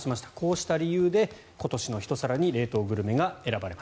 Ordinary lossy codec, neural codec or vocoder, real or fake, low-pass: none; none; real; none